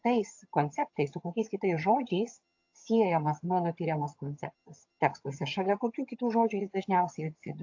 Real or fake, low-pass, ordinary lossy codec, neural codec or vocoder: fake; 7.2 kHz; AAC, 48 kbps; vocoder, 22.05 kHz, 80 mel bands, HiFi-GAN